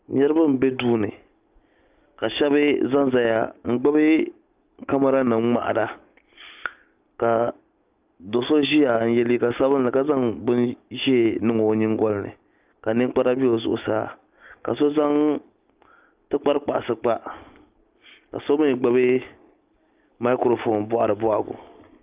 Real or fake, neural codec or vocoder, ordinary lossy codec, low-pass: real; none; Opus, 24 kbps; 3.6 kHz